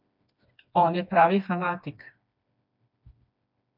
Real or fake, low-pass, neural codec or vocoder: fake; 5.4 kHz; codec, 16 kHz, 2 kbps, FreqCodec, smaller model